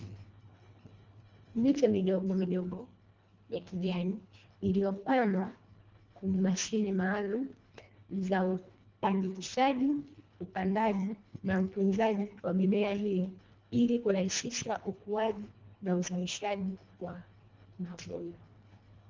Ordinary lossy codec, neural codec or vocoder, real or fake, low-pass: Opus, 32 kbps; codec, 24 kHz, 1.5 kbps, HILCodec; fake; 7.2 kHz